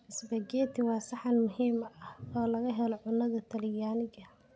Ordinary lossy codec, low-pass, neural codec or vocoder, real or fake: none; none; none; real